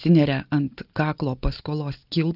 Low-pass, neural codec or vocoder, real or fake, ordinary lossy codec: 5.4 kHz; none; real; Opus, 24 kbps